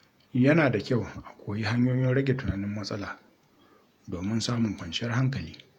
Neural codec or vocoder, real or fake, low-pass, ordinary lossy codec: vocoder, 48 kHz, 128 mel bands, Vocos; fake; 19.8 kHz; none